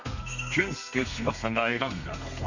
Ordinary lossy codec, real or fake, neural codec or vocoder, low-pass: none; fake; codec, 32 kHz, 1.9 kbps, SNAC; 7.2 kHz